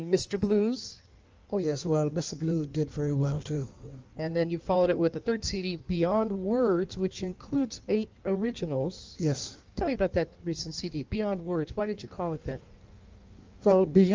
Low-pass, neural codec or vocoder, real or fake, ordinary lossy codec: 7.2 kHz; codec, 16 kHz in and 24 kHz out, 1.1 kbps, FireRedTTS-2 codec; fake; Opus, 24 kbps